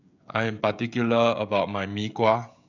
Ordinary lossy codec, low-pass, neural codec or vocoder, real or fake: none; 7.2 kHz; codec, 16 kHz, 16 kbps, FreqCodec, smaller model; fake